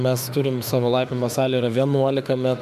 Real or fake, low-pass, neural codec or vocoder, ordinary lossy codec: fake; 14.4 kHz; autoencoder, 48 kHz, 32 numbers a frame, DAC-VAE, trained on Japanese speech; AAC, 96 kbps